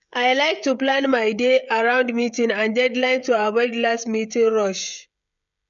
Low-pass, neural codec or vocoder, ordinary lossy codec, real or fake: 7.2 kHz; codec, 16 kHz, 16 kbps, FreqCodec, smaller model; none; fake